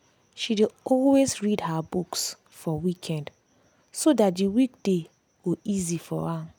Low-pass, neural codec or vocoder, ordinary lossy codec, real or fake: none; none; none; real